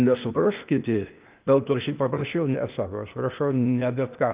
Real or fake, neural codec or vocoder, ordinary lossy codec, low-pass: fake; codec, 16 kHz, 0.8 kbps, ZipCodec; Opus, 24 kbps; 3.6 kHz